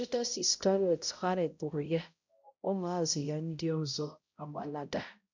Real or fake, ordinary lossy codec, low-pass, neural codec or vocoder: fake; MP3, 64 kbps; 7.2 kHz; codec, 16 kHz, 0.5 kbps, X-Codec, HuBERT features, trained on balanced general audio